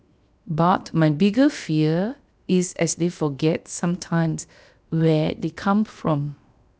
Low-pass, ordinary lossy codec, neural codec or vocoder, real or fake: none; none; codec, 16 kHz, 0.7 kbps, FocalCodec; fake